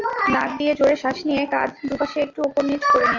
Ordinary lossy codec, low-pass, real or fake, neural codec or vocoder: AAC, 48 kbps; 7.2 kHz; real; none